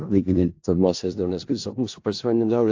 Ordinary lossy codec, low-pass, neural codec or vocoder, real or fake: AAC, 48 kbps; 7.2 kHz; codec, 16 kHz in and 24 kHz out, 0.4 kbps, LongCat-Audio-Codec, four codebook decoder; fake